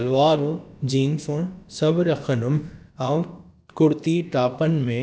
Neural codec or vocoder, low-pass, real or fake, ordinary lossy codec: codec, 16 kHz, about 1 kbps, DyCAST, with the encoder's durations; none; fake; none